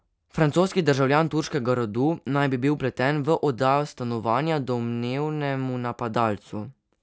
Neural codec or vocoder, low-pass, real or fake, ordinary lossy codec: none; none; real; none